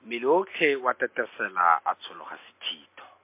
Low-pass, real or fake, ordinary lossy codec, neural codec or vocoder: 3.6 kHz; real; MP3, 24 kbps; none